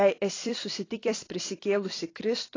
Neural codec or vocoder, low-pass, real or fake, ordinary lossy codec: vocoder, 44.1 kHz, 128 mel bands every 256 samples, BigVGAN v2; 7.2 kHz; fake; AAC, 32 kbps